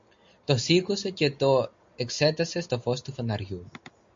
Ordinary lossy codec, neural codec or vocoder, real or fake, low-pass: MP3, 48 kbps; none; real; 7.2 kHz